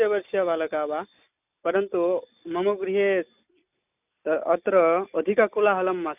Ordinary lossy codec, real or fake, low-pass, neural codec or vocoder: none; real; 3.6 kHz; none